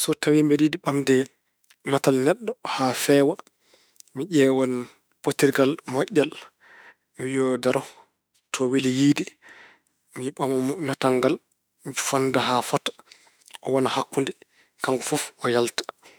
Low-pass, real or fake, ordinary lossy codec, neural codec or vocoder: none; fake; none; autoencoder, 48 kHz, 32 numbers a frame, DAC-VAE, trained on Japanese speech